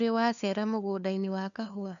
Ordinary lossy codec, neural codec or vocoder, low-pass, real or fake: none; codec, 16 kHz, 2 kbps, FunCodec, trained on LibriTTS, 25 frames a second; 7.2 kHz; fake